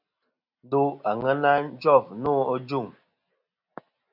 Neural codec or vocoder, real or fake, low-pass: none; real; 5.4 kHz